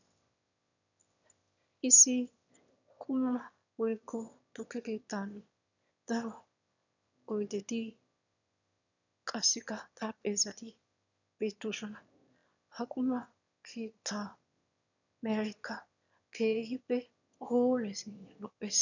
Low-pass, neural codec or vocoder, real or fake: 7.2 kHz; autoencoder, 22.05 kHz, a latent of 192 numbers a frame, VITS, trained on one speaker; fake